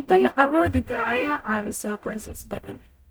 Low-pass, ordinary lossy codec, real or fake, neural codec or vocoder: none; none; fake; codec, 44.1 kHz, 0.9 kbps, DAC